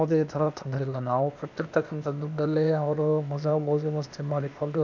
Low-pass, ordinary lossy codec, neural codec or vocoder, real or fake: 7.2 kHz; none; codec, 16 kHz, 0.8 kbps, ZipCodec; fake